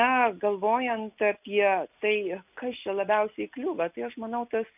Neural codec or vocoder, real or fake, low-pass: none; real; 3.6 kHz